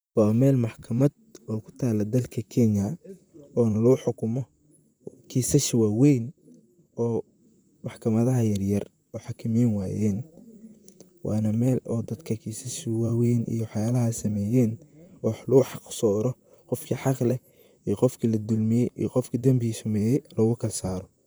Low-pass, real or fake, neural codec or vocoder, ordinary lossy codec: none; fake; vocoder, 44.1 kHz, 128 mel bands, Pupu-Vocoder; none